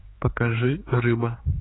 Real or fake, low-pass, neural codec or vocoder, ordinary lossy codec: fake; 7.2 kHz; codec, 16 kHz, 4 kbps, X-Codec, HuBERT features, trained on general audio; AAC, 16 kbps